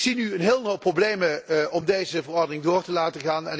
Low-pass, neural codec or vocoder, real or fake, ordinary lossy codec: none; none; real; none